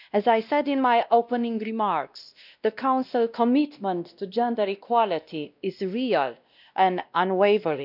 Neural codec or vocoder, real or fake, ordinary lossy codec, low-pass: codec, 16 kHz, 1 kbps, X-Codec, WavLM features, trained on Multilingual LibriSpeech; fake; none; 5.4 kHz